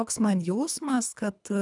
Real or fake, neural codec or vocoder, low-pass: fake; codec, 24 kHz, 3 kbps, HILCodec; 10.8 kHz